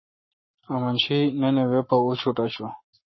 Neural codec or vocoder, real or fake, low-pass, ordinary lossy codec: codec, 44.1 kHz, 7.8 kbps, Pupu-Codec; fake; 7.2 kHz; MP3, 24 kbps